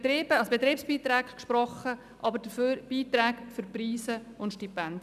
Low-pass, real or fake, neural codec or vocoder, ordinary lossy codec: 14.4 kHz; real; none; none